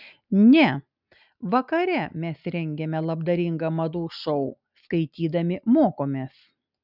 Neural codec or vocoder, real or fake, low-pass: none; real; 5.4 kHz